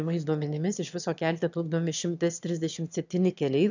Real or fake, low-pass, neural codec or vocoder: fake; 7.2 kHz; autoencoder, 22.05 kHz, a latent of 192 numbers a frame, VITS, trained on one speaker